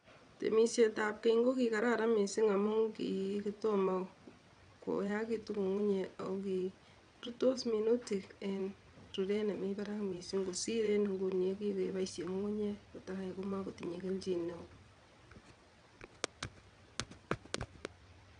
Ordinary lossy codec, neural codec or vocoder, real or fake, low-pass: none; vocoder, 22.05 kHz, 80 mel bands, WaveNeXt; fake; 9.9 kHz